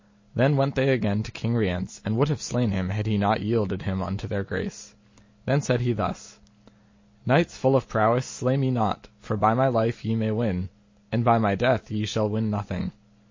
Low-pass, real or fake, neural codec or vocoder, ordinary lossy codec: 7.2 kHz; real; none; MP3, 32 kbps